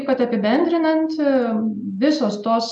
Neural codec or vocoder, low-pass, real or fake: none; 10.8 kHz; real